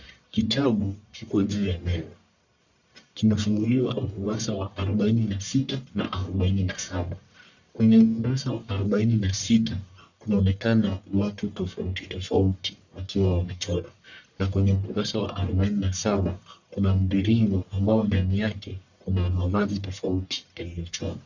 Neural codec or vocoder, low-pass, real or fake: codec, 44.1 kHz, 1.7 kbps, Pupu-Codec; 7.2 kHz; fake